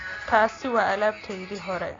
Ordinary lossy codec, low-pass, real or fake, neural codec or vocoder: AAC, 32 kbps; 7.2 kHz; real; none